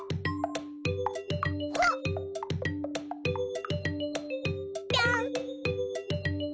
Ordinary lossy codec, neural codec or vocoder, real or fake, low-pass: none; none; real; none